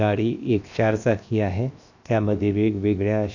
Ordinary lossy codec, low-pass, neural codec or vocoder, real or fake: none; 7.2 kHz; codec, 16 kHz, 0.7 kbps, FocalCodec; fake